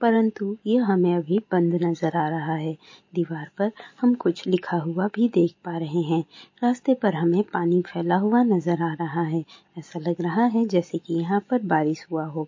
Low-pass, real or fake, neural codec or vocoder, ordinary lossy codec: 7.2 kHz; real; none; MP3, 32 kbps